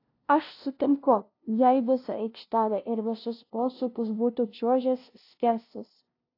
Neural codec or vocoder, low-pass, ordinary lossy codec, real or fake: codec, 16 kHz, 0.5 kbps, FunCodec, trained on LibriTTS, 25 frames a second; 5.4 kHz; AAC, 32 kbps; fake